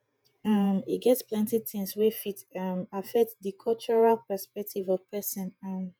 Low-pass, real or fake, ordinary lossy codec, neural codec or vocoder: none; fake; none; vocoder, 48 kHz, 128 mel bands, Vocos